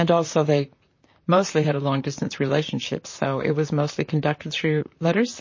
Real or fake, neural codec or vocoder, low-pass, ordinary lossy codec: fake; vocoder, 44.1 kHz, 128 mel bands, Pupu-Vocoder; 7.2 kHz; MP3, 32 kbps